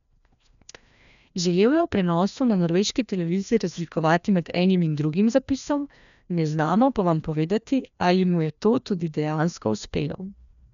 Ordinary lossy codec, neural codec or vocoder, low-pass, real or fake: none; codec, 16 kHz, 1 kbps, FreqCodec, larger model; 7.2 kHz; fake